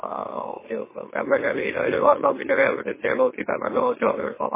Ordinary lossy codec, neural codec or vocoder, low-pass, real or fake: MP3, 16 kbps; autoencoder, 44.1 kHz, a latent of 192 numbers a frame, MeloTTS; 3.6 kHz; fake